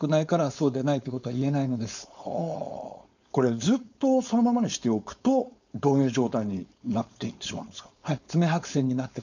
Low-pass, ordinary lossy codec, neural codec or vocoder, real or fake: 7.2 kHz; none; codec, 16 kHz, 4.8 kbps, FACodec; fake